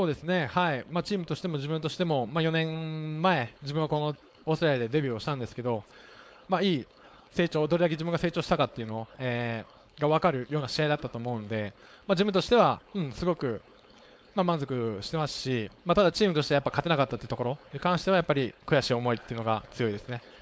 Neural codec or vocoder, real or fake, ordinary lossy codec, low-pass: codec, 16 kHz, 4.8 kbps, FACodec; fake; none; none